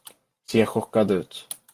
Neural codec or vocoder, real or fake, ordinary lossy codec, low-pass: none; real; Opus, 24 kbps; 14.4 kHz